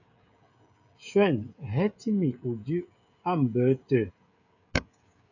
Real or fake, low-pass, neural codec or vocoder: fake; 7.2 kHz; codec, 16 kHz, 16 kbps, FreqCodec, smaller model